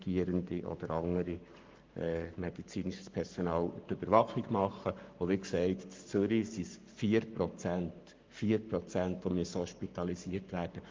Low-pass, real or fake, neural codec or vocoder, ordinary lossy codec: 7.2 kHz; fake; codec, 44.1 kHz, 7.8 kbps, Pupu-Codec; Opus, 24 kbps